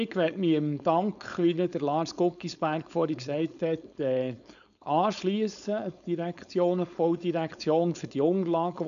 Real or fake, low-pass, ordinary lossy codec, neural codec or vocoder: fake; 7.2 kHz; none; codec, 16 kHz, 4.8 kbps, FACodec